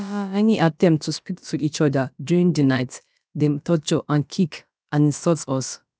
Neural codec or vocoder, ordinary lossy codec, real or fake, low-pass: codec, 16 kHz, about 1 kbps, DyCAST, with the encoder's durations; none; fake; none